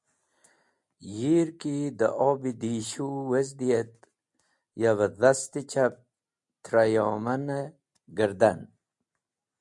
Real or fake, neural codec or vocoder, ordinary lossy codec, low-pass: real; none; MP3, 64 kbps; 10.8 kHz